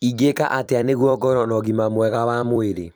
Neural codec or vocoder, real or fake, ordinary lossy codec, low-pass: vocoder, 44.1 kHz, 128 mel bands every 256 samples, BigVGAN v2; fake; none; none